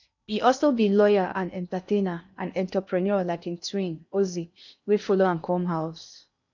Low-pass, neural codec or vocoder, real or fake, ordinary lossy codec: 7.2 kHz; codec, 16 kHz in and 24 kHz out, 0.8 kbps, FocalCodec, streaming, 65536 codes; fake; none